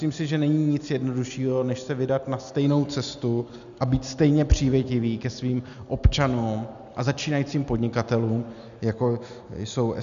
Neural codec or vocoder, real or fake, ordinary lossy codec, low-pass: none; real; MP3, 96 kbps; 7.2 kHz